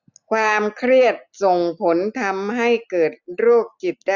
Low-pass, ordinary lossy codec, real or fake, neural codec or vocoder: 7.2 kHz; none; real; none